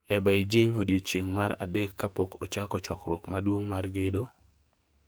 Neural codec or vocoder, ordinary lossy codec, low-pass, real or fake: codec, 44.1 kHz, 2.6 kbps, SNAC; none; none; fake